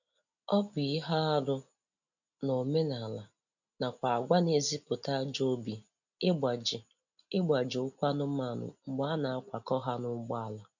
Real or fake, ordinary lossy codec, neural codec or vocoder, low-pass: real; none; none; 7.2 kHz